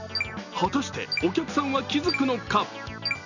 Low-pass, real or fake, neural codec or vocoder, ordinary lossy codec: 7.2 kHz; real; none; none